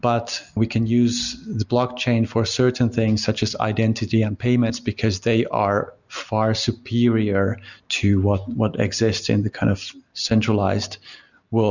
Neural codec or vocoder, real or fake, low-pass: none; real; 7.2 kHz